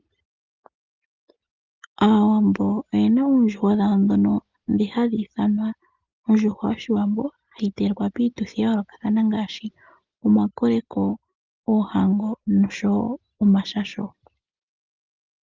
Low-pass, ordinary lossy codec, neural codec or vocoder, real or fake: 7.2 kHz; Opus, 24 kbps; none; real